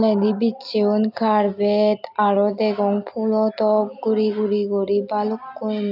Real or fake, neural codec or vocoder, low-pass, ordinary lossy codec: real; none; 5.4 kHz; none